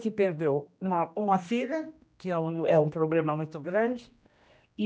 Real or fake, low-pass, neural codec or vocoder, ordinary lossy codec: fake; none; codec, 16 kHz, 1 kbps, X-Codec, HuBERT features, trained on general audio; none